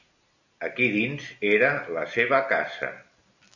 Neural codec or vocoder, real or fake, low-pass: none; real; 7.2 kHz